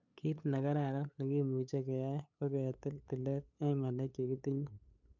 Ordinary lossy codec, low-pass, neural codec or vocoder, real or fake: none; 7.2 kHz; codec, 16 kHz, 8 kbps, FunCodec, trained on LibriTTS, 25 frames a second; fake